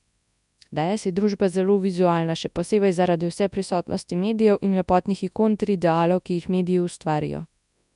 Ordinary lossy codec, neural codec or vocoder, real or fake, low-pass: none; codec, 24 kHz, 0.9 kbps, WavTokenizer, large speech release; fake; 10.8 kHz